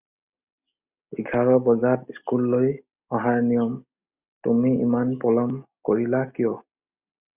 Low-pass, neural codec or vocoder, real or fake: 3.6 kHz; none; real